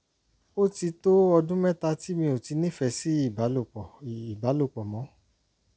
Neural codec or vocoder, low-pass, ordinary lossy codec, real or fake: none; none; none; real